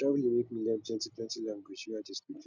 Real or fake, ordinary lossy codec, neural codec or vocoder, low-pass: real; none; none; 7.2 kHz